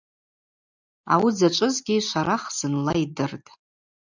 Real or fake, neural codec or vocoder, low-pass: real; none; 7.2 kHz